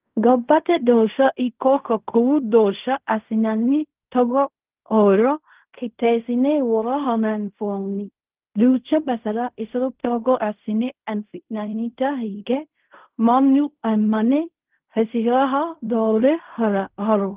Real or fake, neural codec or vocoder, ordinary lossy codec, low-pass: fake; codec, 16 kHz in and 24 kHz out, 0.4 kbps, LongCat-Audio-Codec, fine tuned four codebook decoder; Opus, 32 kbps; 3.6 kHz